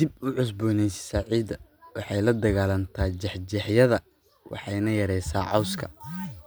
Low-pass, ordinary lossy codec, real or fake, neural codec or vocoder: none; none; real; none